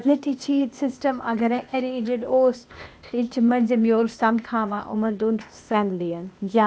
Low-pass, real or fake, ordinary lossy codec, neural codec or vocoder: none; fake; none; codec, 16 kHz, 0.8 kbps, ZipCodec